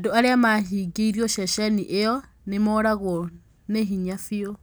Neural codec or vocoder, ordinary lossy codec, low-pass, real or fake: none; none; none; real